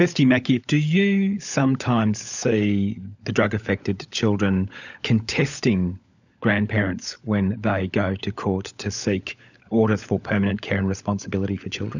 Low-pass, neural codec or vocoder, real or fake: 7.2 kHz; codec, 16 kHz, 16 kbps, FunCodec, trained on LibriTTS, 50 frames a second; fake